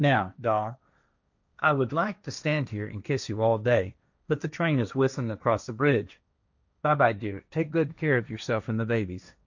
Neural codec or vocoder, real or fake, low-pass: codec, 16 kHz, 1.1 kbps, Voila-Tokenizer; fake; 7.2 kHz